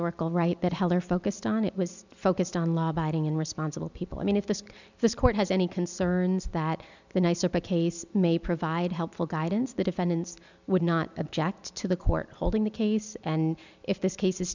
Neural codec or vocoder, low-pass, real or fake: none; 7.2 kHz; real